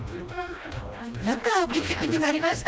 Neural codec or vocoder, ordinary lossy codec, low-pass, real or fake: codec, 16 kHz, 1 kbps, FreqCodec, smaller model; none; none; fake